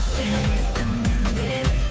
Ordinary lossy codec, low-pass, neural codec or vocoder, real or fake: none; none; codec, 16 kHz, 2 kbps, FunCodec, trained on Chinese and English, 25 frames a second; fake